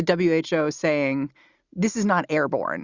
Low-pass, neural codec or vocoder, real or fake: 7.2 kHz; none; real